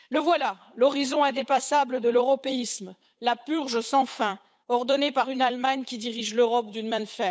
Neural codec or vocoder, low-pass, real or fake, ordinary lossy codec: codec, 16 kHz, 8 kbps, FunCodec, trained on Chinese and English, 25 frames a second; none; fake; none